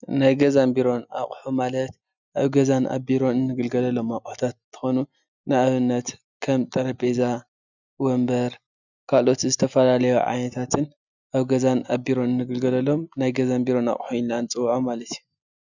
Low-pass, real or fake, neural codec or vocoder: 7.2 kHz; real; none